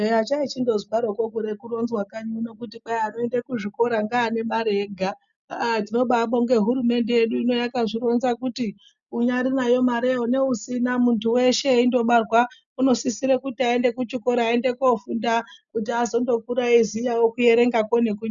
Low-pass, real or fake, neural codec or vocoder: 7.2 kHz; real; none